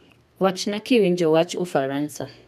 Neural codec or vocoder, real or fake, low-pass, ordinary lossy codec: codec, 32 kHz, 1.9 kbps, SNAC; fake; 14.4 kHz; none